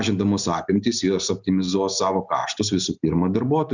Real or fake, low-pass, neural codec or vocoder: real; 7.2 kHz; none